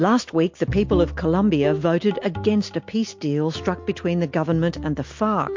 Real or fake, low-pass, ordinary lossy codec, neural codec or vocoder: real; 7.2 kHz; MP3, 48 kbps; none